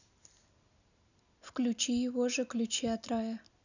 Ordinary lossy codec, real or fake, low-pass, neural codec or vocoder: none; real; 7.2 kHz; none